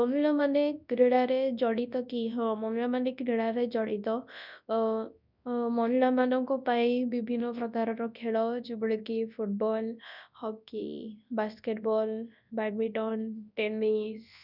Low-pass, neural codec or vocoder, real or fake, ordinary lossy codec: 5.4 kHz; codec, 24 kHz, 0.9 kbps, WavTokenizer, large speech release; fake; none